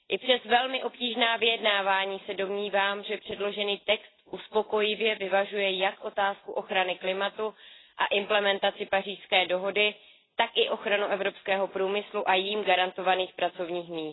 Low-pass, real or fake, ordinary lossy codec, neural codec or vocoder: 7.2 kHz; real; AAC, 16 kbps; none